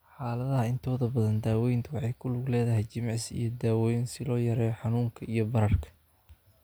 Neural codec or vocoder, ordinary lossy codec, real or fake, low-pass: none; none; real; none